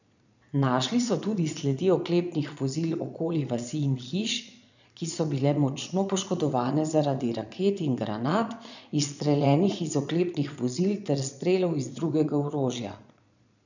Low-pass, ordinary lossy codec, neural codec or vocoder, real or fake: 7.2 kHz; none; vocoder, 22.05 kHz, 80 mel bands, Vocos; fake